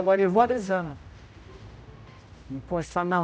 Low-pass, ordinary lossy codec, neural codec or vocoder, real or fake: none; none; codec, 16 kHz, 0.5 kbps, X-Codec, HuBERT features, trained on general audio; fake